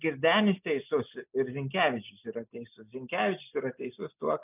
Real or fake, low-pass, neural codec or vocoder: real; 3.6 kHz; none